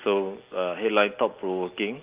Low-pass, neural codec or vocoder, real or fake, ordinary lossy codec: 3.6 kHz; none; real; Opus, 32 kbps